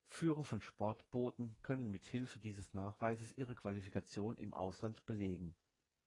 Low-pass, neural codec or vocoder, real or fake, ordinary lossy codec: 10.8 kHz; codec, 44.1 kHz, 2.6 kbps, SNAC; fake; AAC, 32 kbps